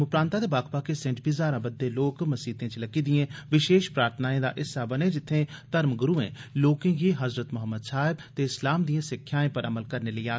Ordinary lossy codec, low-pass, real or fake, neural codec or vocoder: none; none; real; none